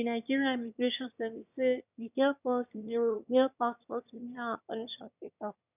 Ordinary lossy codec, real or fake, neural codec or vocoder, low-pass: none; fake; autoencoder, 22.05 kHz, a latent of 192 numbers a frame, VITS, trained on one speaker; 3.6 kHz